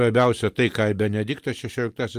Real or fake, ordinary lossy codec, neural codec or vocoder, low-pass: real; Opus, 32 kbps; none; 14.4 kHz